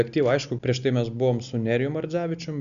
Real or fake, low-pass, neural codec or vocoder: real; 7.2 kHz; none